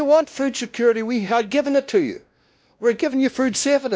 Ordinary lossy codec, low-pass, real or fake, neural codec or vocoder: none; none; fake; codec, 16 kHz, 1 kbps, X-Codec, WavLM features, trained on Multilingual LibriSpeech